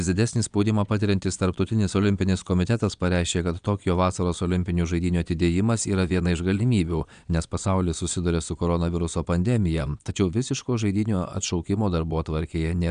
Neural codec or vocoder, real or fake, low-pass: vocoder, 22.05 kHz, 80 mel bands, Vocos; fake; 9.9 kHz